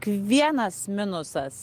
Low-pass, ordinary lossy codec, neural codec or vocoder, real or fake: 14.4 kHz; Opus, 24 kbps; none; real